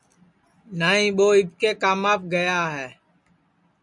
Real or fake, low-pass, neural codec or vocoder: real; 10.8 kHz; none